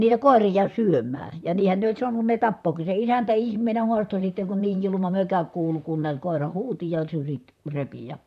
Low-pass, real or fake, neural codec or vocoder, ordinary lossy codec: 14.4 kHz; fake; vocoder, 44.1 kHz, 128 mel bands, Pupu-Vocoder; none